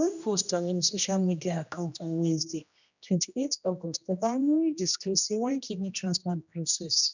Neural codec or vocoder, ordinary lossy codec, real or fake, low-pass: codec, 16 kHz, 1 kbps, X-Codec, HuBERT features, trained on general audio; none; fake; 7.2 kHz